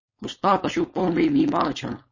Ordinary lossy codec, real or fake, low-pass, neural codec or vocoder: MP3, 32 kbps; fake; 7.2 kHz; codec, 16 kHz, 4.8 kbps, FACodec